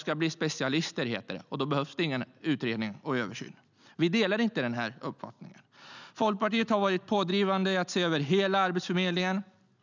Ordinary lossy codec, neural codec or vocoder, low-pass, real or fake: none; none; 7.2 kHz; real